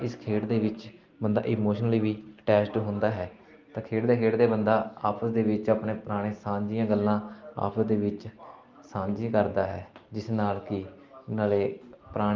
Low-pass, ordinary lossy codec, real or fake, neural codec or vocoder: 7.2 kHz; Opus, 32 kbps; real; none